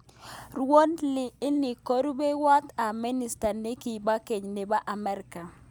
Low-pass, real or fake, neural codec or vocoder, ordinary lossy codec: none; real; none; none